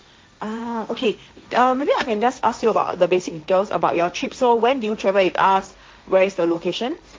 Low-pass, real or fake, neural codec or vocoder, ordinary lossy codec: none; fake; codec, 16 kHz, 1.1 kbps, Voila-Tokenizer; none